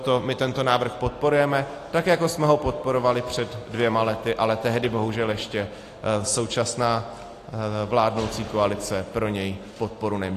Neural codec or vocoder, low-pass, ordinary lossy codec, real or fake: none; 14.4 kHz; AAC, 48 kbps; real